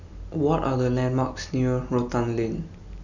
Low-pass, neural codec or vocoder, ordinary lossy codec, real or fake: 7.2 kHz; none; none; real